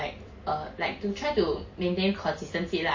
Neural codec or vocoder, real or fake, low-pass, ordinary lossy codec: none; real; 7.2 kHz; MP3, 32 kbps